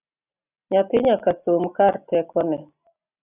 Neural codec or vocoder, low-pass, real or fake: none; 3.6 kHz; real